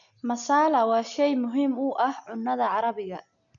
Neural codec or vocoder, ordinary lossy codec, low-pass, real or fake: none; none; 7.2 kHz; real